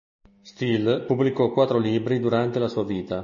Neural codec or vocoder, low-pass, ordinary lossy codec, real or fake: none; 7.2 kHz; MP3, 32 kbps; real